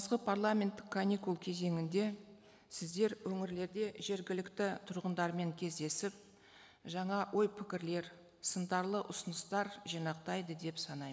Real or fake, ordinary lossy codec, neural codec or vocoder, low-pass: real; none; none; none